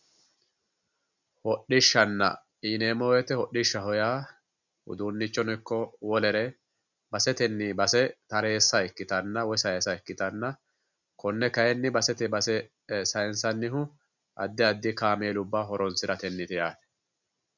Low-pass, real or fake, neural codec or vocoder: 7.2 kHz; real; none